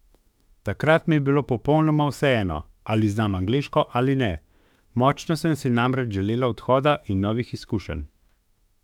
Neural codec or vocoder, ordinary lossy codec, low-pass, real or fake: autoencoder, 48 kHz, 32 numbers a frame, DAC-VAE, trained on Japanese speech; none; 19.8 kHz; fake